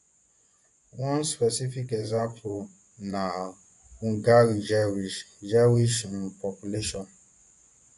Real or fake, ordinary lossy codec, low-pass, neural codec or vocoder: fake; none; 10.8 kHz; vocoder, 24 kHz, 100 mel bands, Vocos